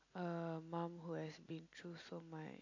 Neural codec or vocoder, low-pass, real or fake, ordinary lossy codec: none; 7.2 kHz; real; AAC, 48 kbps